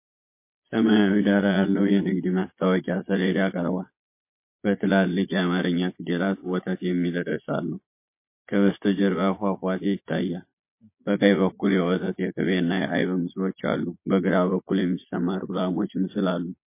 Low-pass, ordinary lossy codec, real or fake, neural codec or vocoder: 3.6 kHz; MP3, 24 kbps; fake; vocoder, 22.05 kHz, 80 mel bands, Vocos